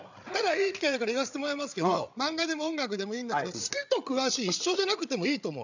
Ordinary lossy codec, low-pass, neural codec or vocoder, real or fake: none; 7.2 kHz; codec, 16 kHz, 8 kbps, FreqCodec, larger model; fake